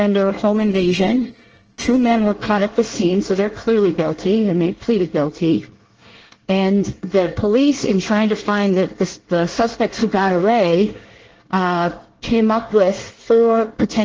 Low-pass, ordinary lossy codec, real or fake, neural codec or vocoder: 7.2 kHz; Opus, 16 kbps; fake; codec, 24 kHz, 1 kbps, SNAC